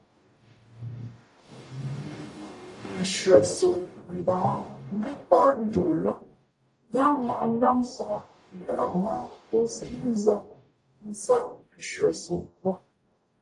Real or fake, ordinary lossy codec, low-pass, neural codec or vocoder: fake; AAC, 48 kbps; 10.8 kHz; codec, 44.1 kHz, 0.9 kbps, DAC